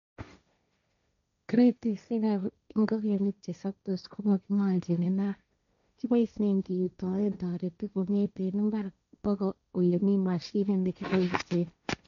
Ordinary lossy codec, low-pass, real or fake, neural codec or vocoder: none; 7.2 kHz; fake; codec, 16 kHz, 1.1 kbps, Voila-Tokenizer